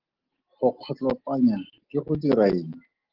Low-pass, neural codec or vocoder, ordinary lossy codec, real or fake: 5.4 kHz; none; Opus, 16 kbps; real